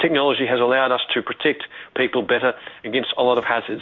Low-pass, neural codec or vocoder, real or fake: 7.2 kHz; codec, 16 kHz in and 24 kHz out, 1 kbps, XY-Tokenizer; fake